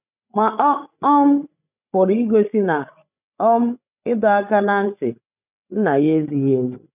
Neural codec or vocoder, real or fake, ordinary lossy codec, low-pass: codec, 16 kHz, 16 kbps, FreqCodec, larger model; fake; none; 3.6 kHz